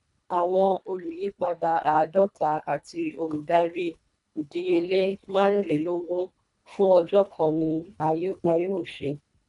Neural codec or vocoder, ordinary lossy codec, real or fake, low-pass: codec, 24 kHz, 1.5 kbps, HILCodec; none; fake; 10.8 kHz